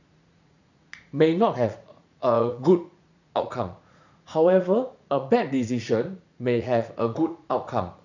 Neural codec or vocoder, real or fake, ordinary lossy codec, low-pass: vocoder, 44.1 kHz, 80 mel bands, Vocos; fake; none; 7.2 kHz